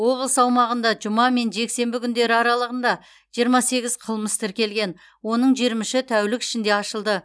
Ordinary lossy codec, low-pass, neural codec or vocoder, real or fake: none; none; none; real